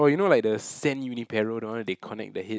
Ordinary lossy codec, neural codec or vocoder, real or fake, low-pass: none; none; real; none